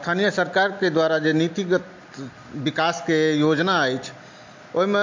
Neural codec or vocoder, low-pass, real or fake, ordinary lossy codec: none; 7.2 kHz; real; MP3, 48 kbps